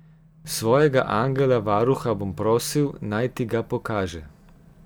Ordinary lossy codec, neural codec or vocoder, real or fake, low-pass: none; none; real; none